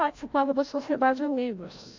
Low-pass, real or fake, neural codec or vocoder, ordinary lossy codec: 7.2 kHz; fake; codec, 16 kHz, 0.5 kbps, FreqCodec, larger model; AAC, 48 kbps